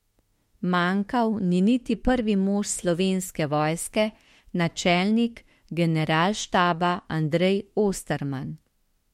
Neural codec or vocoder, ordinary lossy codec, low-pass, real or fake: autoencoder, 48 kHz, 32 numbers a frame, DAC-VAE, trained on Japanese speech; MP3, 64 kbps; 19.8 kHz; fake